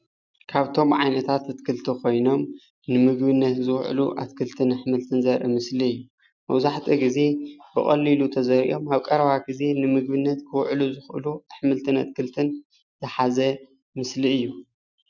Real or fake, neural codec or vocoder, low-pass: real; none; 7.2 kHz